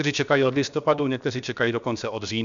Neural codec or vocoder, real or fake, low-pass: codec, 16 kHz, about 1 kbps, DyCAST, with the encoder's durations; fake; 7.2 kHz